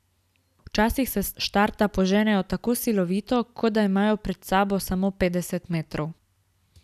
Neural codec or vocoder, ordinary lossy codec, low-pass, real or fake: none; none; 14.4 kHz; real